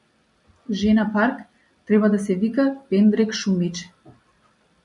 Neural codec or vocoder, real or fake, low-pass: none; real; 10.8 kHz